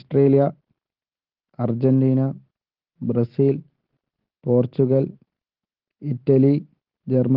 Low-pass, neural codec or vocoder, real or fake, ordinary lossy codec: 5.4 kHz; none; real; Opus, 16 kbps